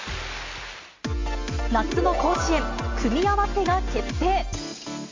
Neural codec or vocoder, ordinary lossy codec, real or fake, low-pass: none; MP3, 48 kbps; real; 7.2 kHz